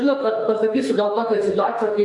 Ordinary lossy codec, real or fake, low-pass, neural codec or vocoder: MP3, 96 kbps; fake; 10.8 kHz; autoencoder, 48 kHz, 32 numbers a frame, DAC-VAE, trained on Japanese speech